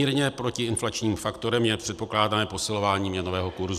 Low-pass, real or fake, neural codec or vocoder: 14.4 kHz; real; none